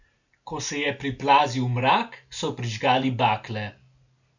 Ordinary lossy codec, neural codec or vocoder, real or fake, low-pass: none; none; real; 7.2 kHz